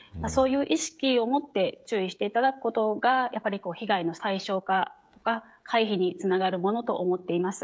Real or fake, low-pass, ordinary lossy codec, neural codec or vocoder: fake; none; none; codec, 16 kHz, 16 kbps, FreqCodec, smaller model